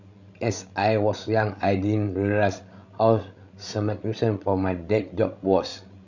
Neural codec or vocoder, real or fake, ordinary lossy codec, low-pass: codec, 16 kHz, 16 kbps, FreqCodec, larger model; fake; none; 7.2 kHz